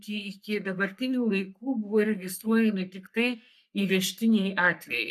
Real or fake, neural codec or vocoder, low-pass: fake; codec, 44.1 kHz, 3.4 kbps, Pupu-Codec; 14.4 kHz